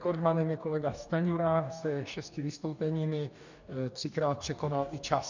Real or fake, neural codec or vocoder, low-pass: fake; codec, 44.1 kHz, 2.6 kbps, DAC; 7.2 kHz